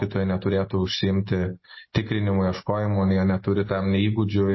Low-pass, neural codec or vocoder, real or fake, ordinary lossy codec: 7.2 kHz; none; real; MP3, 24 kbps